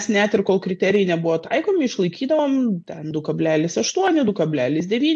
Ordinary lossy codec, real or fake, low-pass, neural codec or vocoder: AAC, 64 kbps; real; 9.9 kHz; none